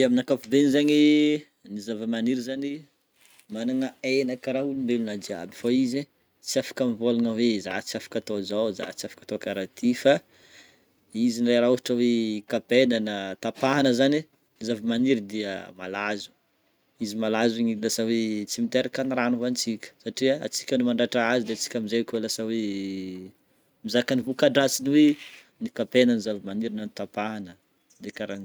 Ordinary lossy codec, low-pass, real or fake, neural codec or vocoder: none; none; real; none